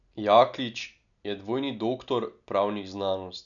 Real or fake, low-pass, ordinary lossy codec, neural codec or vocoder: real; 7.2 kHz; none; none